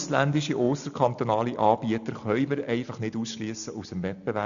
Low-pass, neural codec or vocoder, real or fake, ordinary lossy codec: 7.2 kHz; none; real; none